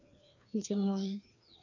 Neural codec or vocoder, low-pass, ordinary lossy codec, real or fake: codec, 16 kHz, 2 kbps, FreqCodec, larger model; 7.2 kHz; none; fake